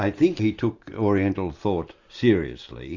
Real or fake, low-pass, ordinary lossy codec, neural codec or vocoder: real; 7.2 kHz; Opus, 64 kbps; none